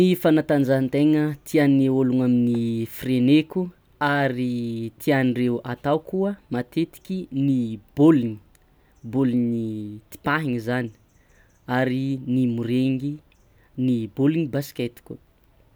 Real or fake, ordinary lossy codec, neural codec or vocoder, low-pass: real; none; none; none